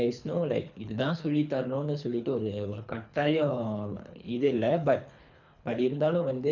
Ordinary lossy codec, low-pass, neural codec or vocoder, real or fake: none; 7.2 kHz; codec, 24 kHz, 3 kbps, HILCodec; fake